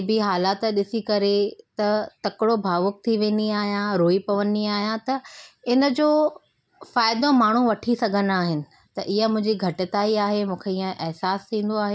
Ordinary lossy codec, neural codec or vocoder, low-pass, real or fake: none; none; none; real